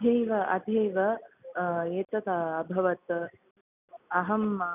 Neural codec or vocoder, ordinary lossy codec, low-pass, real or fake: none; none; 3.6 kHz; real